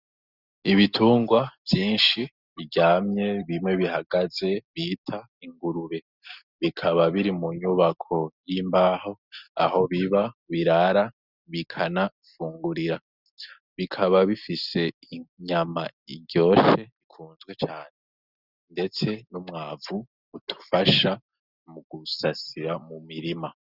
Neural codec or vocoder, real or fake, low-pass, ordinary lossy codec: none; real; 5.4 kHz; Opus, 64 kbps